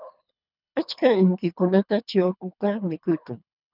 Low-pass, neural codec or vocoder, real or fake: 5.4 kHz; codec, 24 kHz, 3 kbps, HILCodec; fake